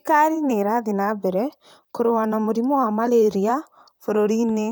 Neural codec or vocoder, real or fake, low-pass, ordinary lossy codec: vocoder, 44.1 kHz, 128 mel bands, Pupu-Vocoder; fake; none; none